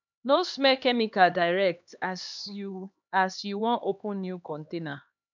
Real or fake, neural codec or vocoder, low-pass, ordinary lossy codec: fake; codec, 16 kHz, 2 kbps, X-Codec, HuBERT features, trained on LibriSpeech; 7.2 kHz; none